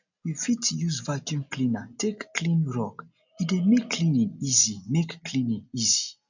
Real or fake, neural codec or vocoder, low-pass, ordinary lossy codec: real; none; 7.2 kHz; none